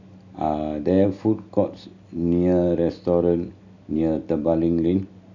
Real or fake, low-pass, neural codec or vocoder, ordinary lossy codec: real; 7.2 kHz; none; none